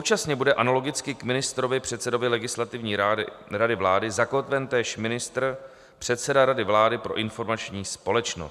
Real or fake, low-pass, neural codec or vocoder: real; 14.4 kHz; none